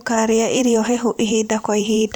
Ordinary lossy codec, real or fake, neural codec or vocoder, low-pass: none; fake; vocoder, 44.1 kHz, 128 mel bands every 512 samples, BigVGAN v2; none